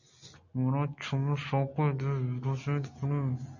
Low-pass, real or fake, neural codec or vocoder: 7.2 kHz; real; none